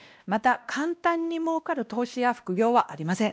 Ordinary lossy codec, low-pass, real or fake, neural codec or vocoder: none; none; fake; codec, 16 kHz, 1 kbps, X-Codec, WavLM features, trained on Multilingual LibriSpeech